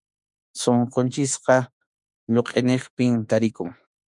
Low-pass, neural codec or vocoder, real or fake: 10.8 kHz; autoencoder, 48 kHz, 32 numbers a frame, DAC-VAE, trained on Japanese speech; fake